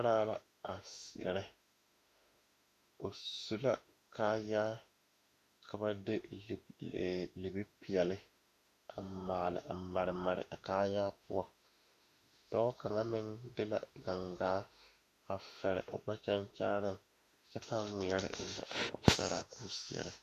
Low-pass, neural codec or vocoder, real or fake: 14.4 kHz; autoencoder, 48 kHz, 32 numbers a frame, DAC-VAE, trained on Japanese speech; fake